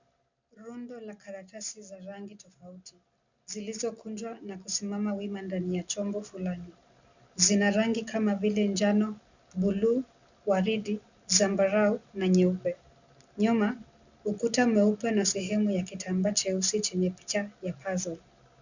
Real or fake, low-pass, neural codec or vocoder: real; 7.2 kHz; none